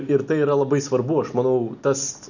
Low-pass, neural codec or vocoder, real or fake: 7.2 kHz; none; real